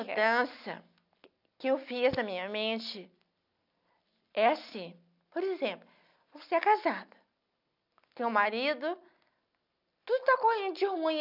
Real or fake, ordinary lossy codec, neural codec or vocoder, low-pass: real; none; none; 5.4 kHz